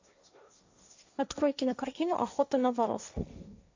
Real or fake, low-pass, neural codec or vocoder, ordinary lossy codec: fake; 7.2 kHz; codec, 16 kHz, 1.1 kbps, Voila-Tokenizer; AAC, 48 kbps